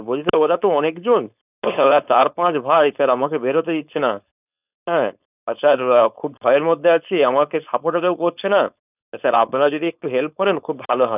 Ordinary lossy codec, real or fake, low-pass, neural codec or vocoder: none; fake; 3.6 kHz; codec, 16 kHz, 4.8 kbps, FACodec